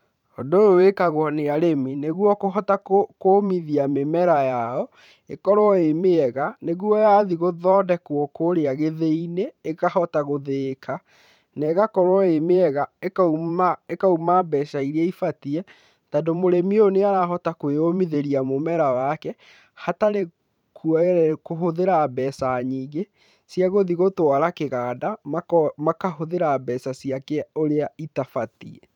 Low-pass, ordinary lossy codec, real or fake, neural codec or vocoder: 19.8 kHz; none; fake; vocoder, 44.1 kHz, 128 mel bands every 512 samples, BigVGAN v2